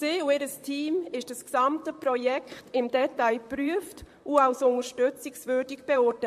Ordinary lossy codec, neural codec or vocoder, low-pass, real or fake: MP3, 64 kbps; vocoder, 44.1 kHz, 128 mel bands, Pupu-Vocoder; 14.4 kHz; fake